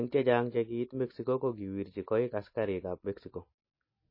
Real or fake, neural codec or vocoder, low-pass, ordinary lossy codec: real; none; 5.4 kHz; MP3, 24 kbps